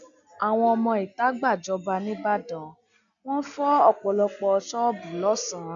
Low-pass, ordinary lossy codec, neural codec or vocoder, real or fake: 7.2 kHz; none; none; real